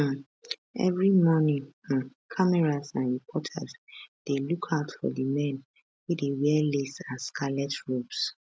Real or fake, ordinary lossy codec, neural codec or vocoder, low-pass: real; none; none; none